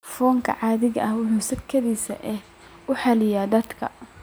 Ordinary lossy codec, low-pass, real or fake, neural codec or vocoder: none; none; real; none